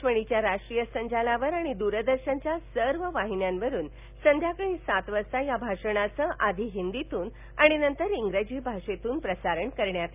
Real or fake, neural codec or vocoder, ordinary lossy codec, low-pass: real; none; none; 3.6 kHz